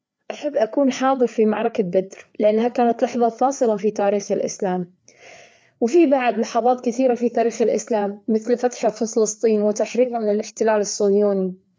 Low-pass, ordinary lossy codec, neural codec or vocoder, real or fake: none; none; codec, 16 kHz, 4 kbps, FreqCodec, larger model; fake